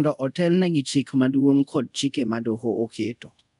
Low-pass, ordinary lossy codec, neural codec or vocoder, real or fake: 10.8 kHz; none; codec, 24 kHz, 0.5 kbps, DualCodec; fake